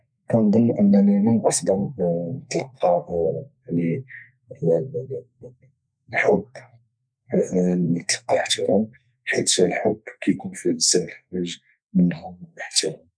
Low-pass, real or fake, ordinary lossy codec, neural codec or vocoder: 9.9 kHz; fake; none; codec, 32 kHz, 1.9 kbps, SNAC